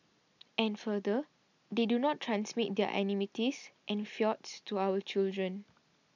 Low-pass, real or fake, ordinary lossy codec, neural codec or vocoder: 7.2 kHz; real; none; none